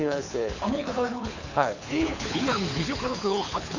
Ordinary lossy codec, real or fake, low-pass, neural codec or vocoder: none; fake; 7.2 kHz; codec, 24 kHz, 6 kbps, HILCodec